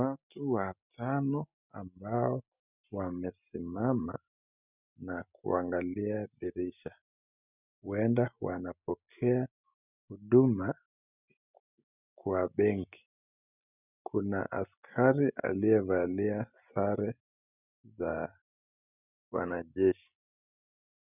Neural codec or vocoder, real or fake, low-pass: none; real; 3.6 kHz